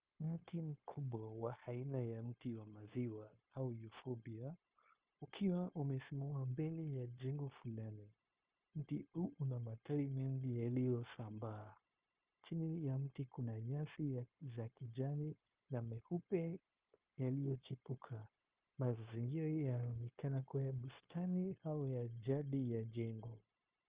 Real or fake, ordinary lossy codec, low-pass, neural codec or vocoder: fake; Opus, 32 kbps; 3.6 kHz; codec, 16 kHz, 0.9 kbps, LongCat-Audio-Codec